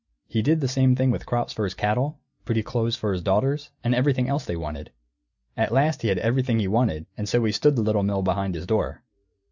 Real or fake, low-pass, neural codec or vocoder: real; 7.2 kHz; none